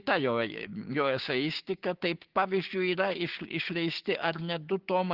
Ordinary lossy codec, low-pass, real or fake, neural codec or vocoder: Opus, 16 kbps; 5.4 kHz; fake; codec, 16 kHz, 6 kbps, DAC